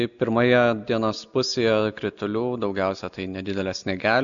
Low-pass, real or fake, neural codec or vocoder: 7.2 kHz; real; none